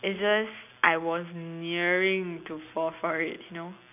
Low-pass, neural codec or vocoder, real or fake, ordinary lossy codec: 3.6 kHz; none; real; none